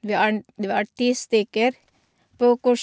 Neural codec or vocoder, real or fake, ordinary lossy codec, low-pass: none; real; none; none